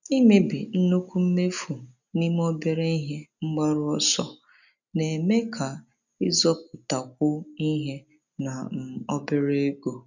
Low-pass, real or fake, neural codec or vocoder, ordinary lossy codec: 7.2 kHz; real; none; none